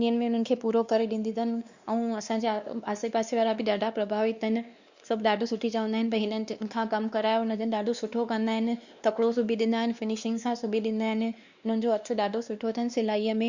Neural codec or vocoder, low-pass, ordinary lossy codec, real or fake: codec, 16 kHz, 2 kbps, X-Codec, WavLM features, trained on Multilingual LibriSpeech; 7.2 kHz; Opus, 64 kbps; fake